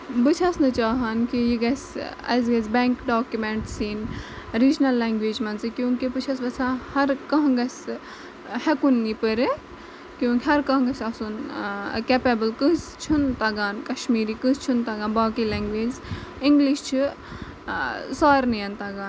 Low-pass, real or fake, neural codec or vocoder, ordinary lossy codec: none; real; none; none